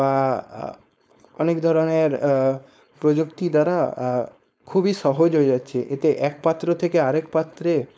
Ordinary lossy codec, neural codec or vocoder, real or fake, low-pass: none; codec, 16 kHz, 4.8 kbps, FACodec; fake; none